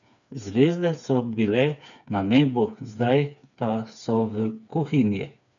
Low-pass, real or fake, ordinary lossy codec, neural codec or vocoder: 7.2 kHz; fake; none; codec, 16 kHz, 4 kbps, FreqCodec, smaller model